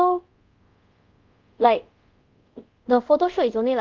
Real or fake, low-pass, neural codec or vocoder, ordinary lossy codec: fake; 7.2 kHz; codec, 24 kHz, 0.5 kbps, DualCodec; Opus, 24 kbps